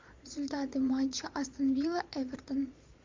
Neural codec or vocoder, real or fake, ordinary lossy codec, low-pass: vocoder, 22.05 kHz, 80 mel bands, Vocos; fake; MP3, 64 kbps; 7.2 kHz